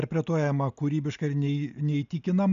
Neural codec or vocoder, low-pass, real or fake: none; 7.2 kHz; real